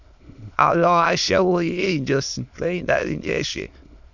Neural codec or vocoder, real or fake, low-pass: autoencoder, 22.05 kHz, a latent of 192 numbers a frame, VITS, trained on many speakers; fake; 7.2 kHz